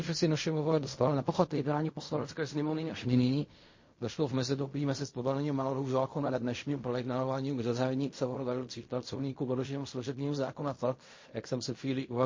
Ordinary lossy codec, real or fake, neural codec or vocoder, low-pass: MP3, 32 kbps; fake; codec, 16 kHz in and 24 kHz out, 0.4 kbps, LongCat-Audio-Codec, fine tuned four codebook decoder; 7.2 kHz